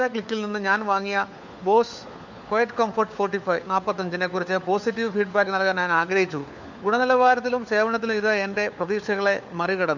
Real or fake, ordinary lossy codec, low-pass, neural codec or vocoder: fake; none; 7.2 kHz; codec, 16 kHz, 4 kbps, FunCodec, trained on LibriTTS, 50 frames a second